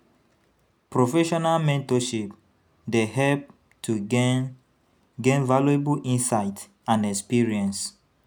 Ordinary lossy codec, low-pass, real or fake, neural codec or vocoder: none; none; real; none